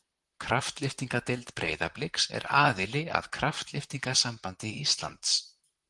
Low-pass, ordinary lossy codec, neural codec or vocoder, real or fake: 10.8 kHz; Opus, 24 kbps; vocoder, 44.1 kHz, 128 mel bands, Pupu-Vocoder; fake